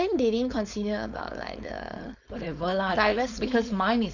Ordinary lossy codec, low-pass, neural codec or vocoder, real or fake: none; 7.2 kHz; codec, 16 kHz, 4.8 kbps, FACodec; fake